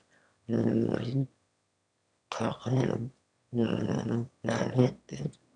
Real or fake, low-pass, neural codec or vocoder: fake; 9.9 kHz; autoencoder, 22.05 kHz, a latent of 192 numbers a frame, VITS, trained on one speaker